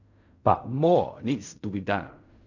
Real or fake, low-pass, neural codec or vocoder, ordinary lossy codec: fake; 7.2 kHz; codec, 16 kHz in and 24 kHz out, 0.4 kbps, LongCat-Audio-Codec, fine tuned four codebook decoder; none